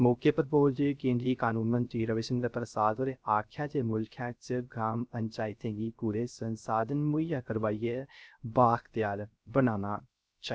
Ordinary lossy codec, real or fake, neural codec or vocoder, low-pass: none; fake; codec, 16 kHz, 0.3 kbps, FocalCodec; none